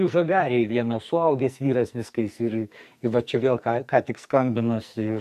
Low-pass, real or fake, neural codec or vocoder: 14.4 kHz; fake; codec, 44.1 kHz, 2.6 kbps, SNAC